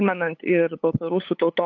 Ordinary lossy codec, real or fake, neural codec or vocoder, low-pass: AAC, 48 kbps; fake; codec, 16 kHz, 8 kbps, FunCodec, trained on LibriTTS, 25 frames a second; 7.2 kHz